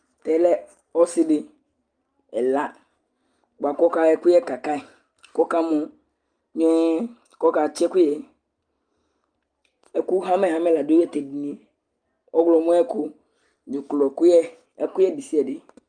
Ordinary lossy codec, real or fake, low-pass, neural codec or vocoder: Opus, 32 kbps; real; 9.9 kHz; none